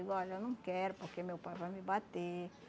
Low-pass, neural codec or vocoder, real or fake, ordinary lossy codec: none; none; real; none